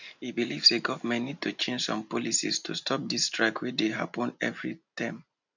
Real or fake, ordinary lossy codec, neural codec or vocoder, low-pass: real; none; none; 7.2 kHz